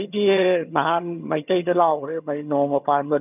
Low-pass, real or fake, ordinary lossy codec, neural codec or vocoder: 3.6 kHz; fake; none; vocoder, 22.05 kHz, 80 mel bands, HiFi-GAN